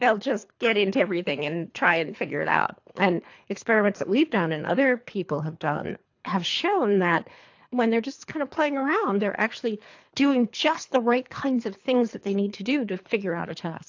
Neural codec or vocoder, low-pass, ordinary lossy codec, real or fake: codec, 24 kHz, 3 kbps, HILCodec; 7.2 kHz; AAC, 48 kbps; fake